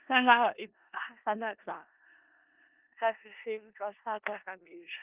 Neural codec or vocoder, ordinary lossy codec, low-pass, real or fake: codec, 16 kHz in and 24 kHz out, 0.4 kbps, LongCat-Audio-Codec, four codebook decoder; Opus, 24 kbps; 3.6 kHz; fake